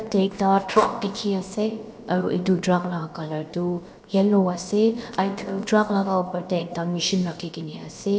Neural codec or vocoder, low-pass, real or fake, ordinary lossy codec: codec, 16 kHz, about 1 kbps, DyCAST, with the encoder's durations; none; fake; none